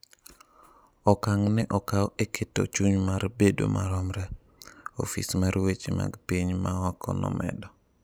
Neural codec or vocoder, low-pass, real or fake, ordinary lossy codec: none; none; real; none